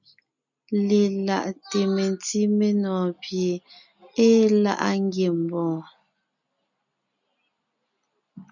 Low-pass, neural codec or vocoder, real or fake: 7.2 kHz; none; real